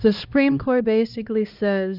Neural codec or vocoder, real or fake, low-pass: codec, 16 kHz, 1 kbps, X-Codec, HuBERT features, trained on LibriSpeech; fake; 5.4 kHz